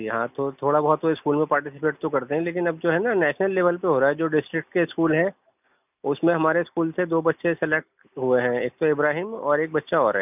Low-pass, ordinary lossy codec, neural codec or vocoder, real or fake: 3.6 kHz; none; none; real